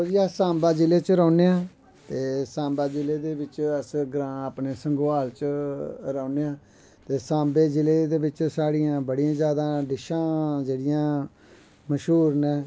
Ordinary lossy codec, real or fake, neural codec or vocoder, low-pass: none; real; none; none